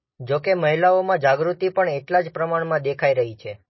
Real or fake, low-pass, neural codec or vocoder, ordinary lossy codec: real; 7.2 kHz; none; MP3, 24 kbps